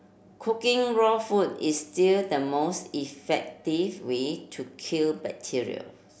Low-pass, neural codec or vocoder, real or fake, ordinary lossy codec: none; none; real; none